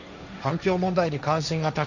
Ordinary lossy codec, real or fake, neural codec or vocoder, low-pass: none; fake; codec, 16 kHz, 1.1 kbps, Voila-Tokenizer; 7.2 kHz